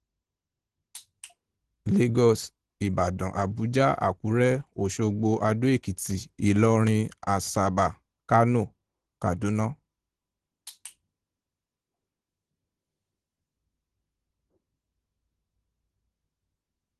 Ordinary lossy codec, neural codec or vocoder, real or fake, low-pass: Opus, 16 kbps; none; real; 10.8 kHz